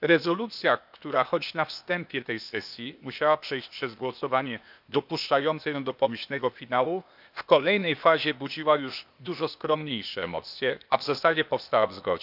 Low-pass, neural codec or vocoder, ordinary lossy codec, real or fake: 5.4 kHz; codec, 16 kHz, 0.8 kbps, ZipCodec; none; fake